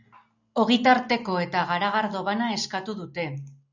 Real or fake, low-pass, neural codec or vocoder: real; 7.2 kHz; none